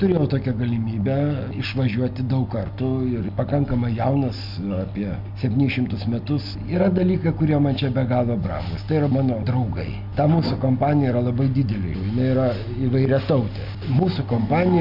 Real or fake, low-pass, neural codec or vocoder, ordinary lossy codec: real; 5.4 kHz; none; MP3, 48 kbps